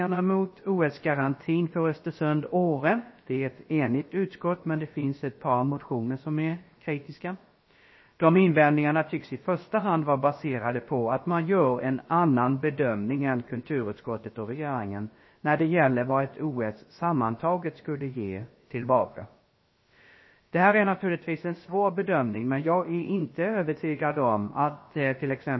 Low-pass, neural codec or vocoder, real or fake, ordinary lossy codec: 7.2 kHz; codec, 16 kHz, about 1 kbps, DyCAST, with the encoder's durations; fake; MP3, 24 kbps